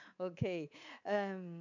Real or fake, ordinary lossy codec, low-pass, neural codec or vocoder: fake; none; 7.2 kHz; codec, 24 kHz, 3.1 kbps, DualCodec